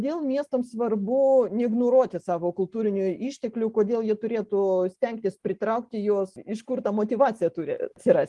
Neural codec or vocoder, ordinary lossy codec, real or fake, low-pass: none; Opus, 24 kbps; real; 10.8 kHz